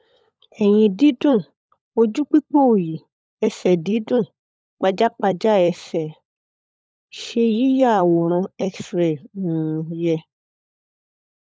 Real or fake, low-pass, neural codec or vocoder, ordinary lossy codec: fake; none; codec, 16 kHz, 16 kbps, FunCodec, trained on LibriTTS, 50 frames a second; none